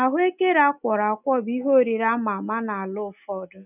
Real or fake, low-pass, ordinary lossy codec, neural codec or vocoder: real; 3.6 kHz; none; none